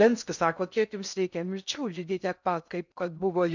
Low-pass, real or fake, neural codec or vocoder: 7.2 kHz; fake; codec, 16 kHz in and 24 kHz out, 0.6 kbps, FocalCodec, streaming, 2048 codes